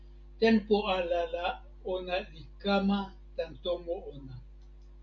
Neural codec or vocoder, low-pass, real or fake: none; 7.2 kHz; real